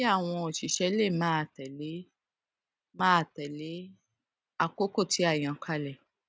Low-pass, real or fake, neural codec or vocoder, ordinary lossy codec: none; real; none; none